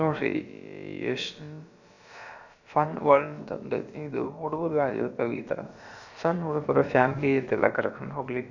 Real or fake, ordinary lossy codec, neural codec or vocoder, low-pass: fake; none; codec, 16 kHz, about 1 kbps, DyCAST, with the encoder's durations; 7.2 kHz